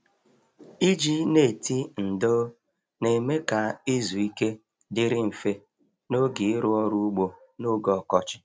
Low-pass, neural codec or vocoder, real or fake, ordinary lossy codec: none; none; real; none